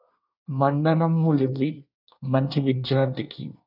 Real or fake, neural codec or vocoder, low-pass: fake; codec, 24 kHz, 1 kbps, SNAC; 5.4 kHz